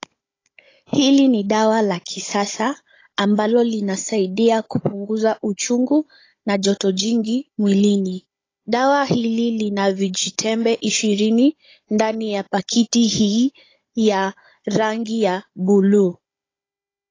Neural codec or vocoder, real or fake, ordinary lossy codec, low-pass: codec, 16 kHz, 16 kbps, FunCodec, trained on Chinese and English, 50 frames a second; fake; AAC, 32 kbps; 7.2 kHz